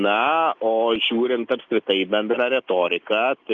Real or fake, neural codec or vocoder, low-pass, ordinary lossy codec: real; none; 7.2 kHz; Opus, 24 kbps